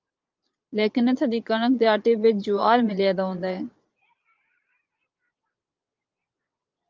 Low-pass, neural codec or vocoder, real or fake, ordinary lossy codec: 7.2 kHz; vocoder, 22.05 kHz, 80 mel bands, Vocos; fake; Opus, 24 kbps